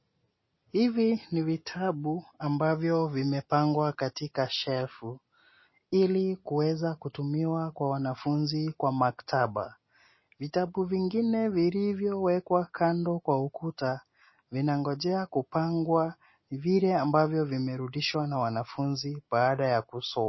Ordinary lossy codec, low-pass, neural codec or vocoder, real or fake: MP3, 24 kbps; 7.2 kHz; none; real